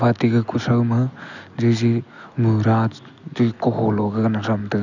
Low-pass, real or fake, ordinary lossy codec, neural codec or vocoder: 7.2 kHz; real; none; none